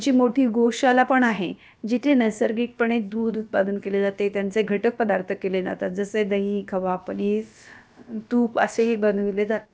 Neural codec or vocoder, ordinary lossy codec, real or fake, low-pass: codec, 16 kHz, about 1 kbps, DyCAST, with the encoder's durations; none; fake; none